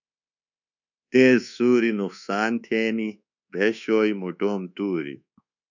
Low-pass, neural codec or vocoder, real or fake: 7.2 kHz; codec, 24 kHz, 1.2 kbps, DualCodec; fake